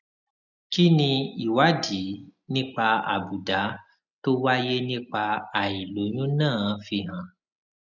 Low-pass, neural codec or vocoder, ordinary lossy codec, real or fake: 7.2 kHz; none; none; real